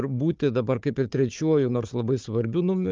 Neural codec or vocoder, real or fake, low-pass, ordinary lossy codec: codec, 16 kHz, 4 kbps, FunCodec, trained on Chinese and English, 50 frames a second; fake; 7.2 kHz; Opus, 32 kbps